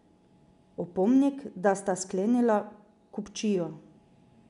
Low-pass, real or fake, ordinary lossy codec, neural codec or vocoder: 10.8 kHz; real; none; none